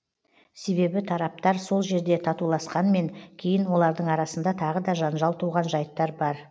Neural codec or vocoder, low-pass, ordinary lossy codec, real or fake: none; none; none; real